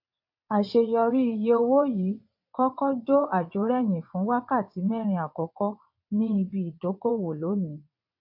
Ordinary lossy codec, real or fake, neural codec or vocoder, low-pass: AAC, 48 kbps; fake; vocoder, 22.05 kHz, 80 mel bands, WaveNeXt; 5.4 kHz